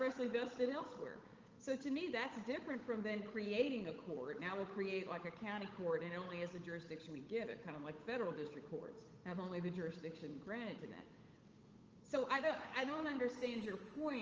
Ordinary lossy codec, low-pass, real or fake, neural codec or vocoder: Opus, 24 kbps; 7.2 kHz; fake; codec, 16 kHz, 8 kbps, FunCodec, trained on Chinese and English, 25 frames a second